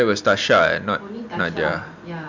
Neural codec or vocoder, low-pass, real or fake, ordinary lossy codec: none; 7.2 kHz; real; MP3, 64 kbps